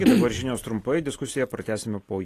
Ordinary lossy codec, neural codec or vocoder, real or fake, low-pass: AAC, 48 kbps; none; real; 14.4 kHz